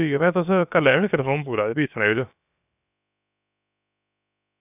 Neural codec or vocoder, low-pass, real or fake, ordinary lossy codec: codec, 16 kHz, about 1 kbps, DyCAST, with the encoder's durations; 3.6 kHz; fake; none